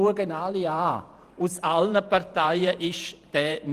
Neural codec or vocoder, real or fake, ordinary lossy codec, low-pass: vocoder, 48 kHz, 128 mel bands, Vocos; fake; Opus, 24 kbps; 14.4 kHz